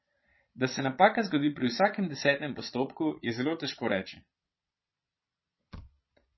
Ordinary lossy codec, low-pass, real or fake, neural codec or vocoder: MP3, 24 kbps; 7.2 kHz; fake; vocoder, 22.05 kHz, 80 mel bands, Vocos